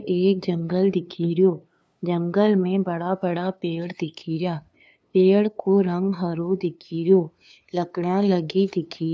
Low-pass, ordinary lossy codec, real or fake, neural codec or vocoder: none; none; fake; codec, 16 kHz, 2 kbps, FunCodec, trained on LibriTTS, 25 frames a second